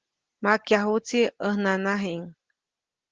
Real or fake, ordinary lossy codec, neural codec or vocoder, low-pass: real; Opus, 16 kbps; none; 7.2 kHz